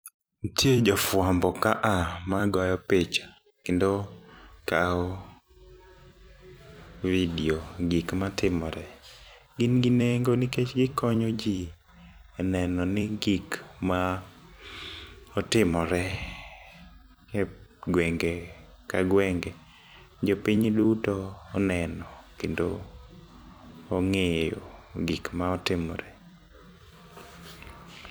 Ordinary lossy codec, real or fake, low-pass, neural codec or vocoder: none; fake; none; vocoder, 44.1 kHz, 128 mel bands every 256 samples, BigVGAN v2